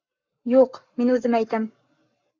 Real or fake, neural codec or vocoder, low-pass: fake; codec, 44.1 kHz, 7.8 kbps, Pupu-Codec; 7.2 kHz